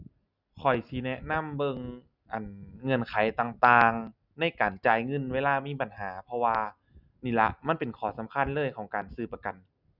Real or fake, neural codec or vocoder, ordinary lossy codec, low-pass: real; none; none; 5.4 kHz